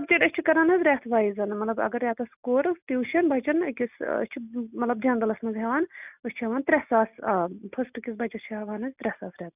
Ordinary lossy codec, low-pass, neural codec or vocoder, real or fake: none; 3.6 kHz; none; real